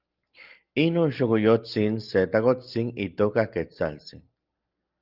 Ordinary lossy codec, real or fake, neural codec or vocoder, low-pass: Opus, 32 kbps; real; none; 5.4 kHz